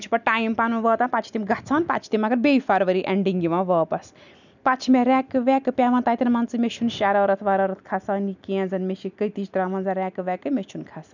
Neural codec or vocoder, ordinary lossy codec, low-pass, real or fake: none; none; 7.2 kHz; real